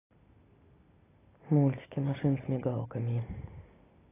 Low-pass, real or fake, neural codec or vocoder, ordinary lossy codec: 3.6 kHz; real; none; AAC, 16 kbps